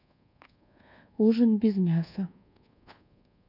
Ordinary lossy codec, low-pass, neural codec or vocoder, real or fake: none; 5.4 kHz; codec, 24 kHz, 1.2 kbps, DualCodec; fake